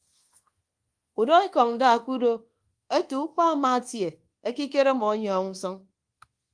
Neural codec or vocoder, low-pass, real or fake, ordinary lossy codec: codec, 24 kHz, 1.2 kbps, DualCodec; 9.9 kHz; fake; Opus, 24 kbps